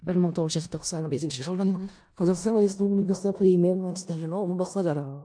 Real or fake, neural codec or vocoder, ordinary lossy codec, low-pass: fake; codec, 16 kHz in and 24 kHz out, 0.4 kbps, LongCat-Audio-Codec, four codebook decoder; AAC, 64 kbps; 9.9 kHz